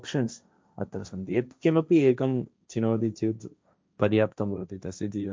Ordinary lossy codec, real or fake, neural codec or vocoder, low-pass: none; fake; codec, 16 kHz, 1.1 kbps, Voila-Tokenizer; none